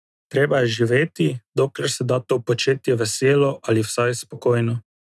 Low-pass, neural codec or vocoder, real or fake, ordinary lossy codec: none; none; real; none